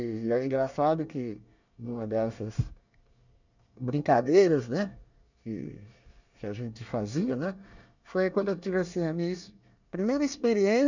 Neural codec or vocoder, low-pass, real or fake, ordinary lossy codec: codec, 24 kHz, 1 kbps, SNAC; 7.2 kHz; fake; none